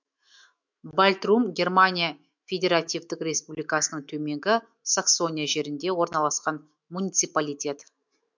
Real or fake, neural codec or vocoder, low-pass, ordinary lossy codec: fake; autoencoder, 48 kHz, 128 numbers a frame, DAC-VAE, trained on Japanese speech; 7.2 kHz; MP3, 64 kbps